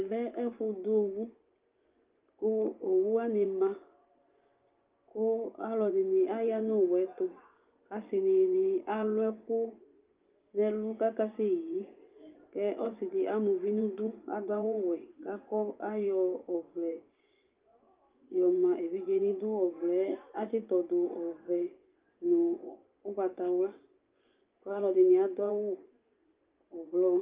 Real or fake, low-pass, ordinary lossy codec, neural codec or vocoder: fake; 3.6 kHz; Opus, 24 kbps; vocoder, 24 kHz, 100 mel bands, Vocos